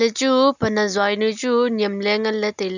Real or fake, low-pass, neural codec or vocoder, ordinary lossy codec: real; 7.2 kHz; none; none